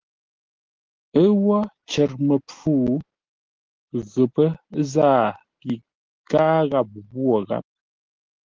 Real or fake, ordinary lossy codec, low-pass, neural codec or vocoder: real; Opus, 16 kbps; 7.2 kHz; none